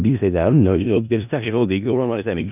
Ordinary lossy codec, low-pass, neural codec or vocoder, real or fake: none; 3.6 kHz; codec, 16 kHz in and 24 kHz out, 0.4 kbps, LongCat-Audio-Codec, four codebook decoder; fake